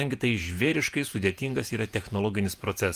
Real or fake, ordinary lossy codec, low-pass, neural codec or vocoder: fake; Opus, 32 kbps; 14.4 kHz; vocoder, 48 kHz, 128 mel bands, Vocos